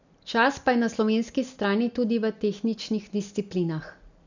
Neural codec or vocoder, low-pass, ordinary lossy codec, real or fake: none; 7.2 kHz; none; real